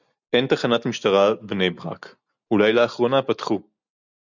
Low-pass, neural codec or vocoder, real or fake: 7.2 kHz; none; real